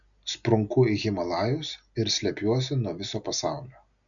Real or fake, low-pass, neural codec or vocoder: real; 7.2 kHz; none